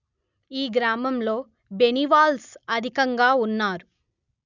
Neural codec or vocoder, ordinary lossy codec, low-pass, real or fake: none; none; 7.2 kHz; real